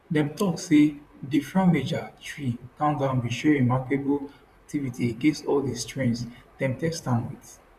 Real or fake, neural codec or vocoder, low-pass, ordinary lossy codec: fake; vocoder, 44.1 kHz, 128 mel bands, Pupu-Vocoder; 14.4 kHz; none